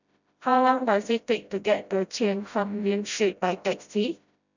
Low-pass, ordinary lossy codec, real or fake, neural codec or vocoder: 7.2 kHz; none; fake; codec, 16 kHz, 0.5 kbps, FreqCodec, smaller model